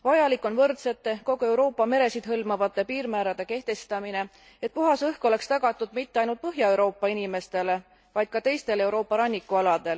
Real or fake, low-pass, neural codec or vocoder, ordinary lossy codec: real; none; none; none